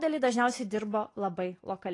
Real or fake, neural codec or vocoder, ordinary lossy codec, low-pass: real; none; AAC, 32 kbps; 10.8 kHz